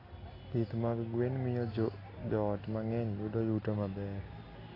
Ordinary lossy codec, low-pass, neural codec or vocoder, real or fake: AAC, 24 kbps; 5.4 kHz; none; real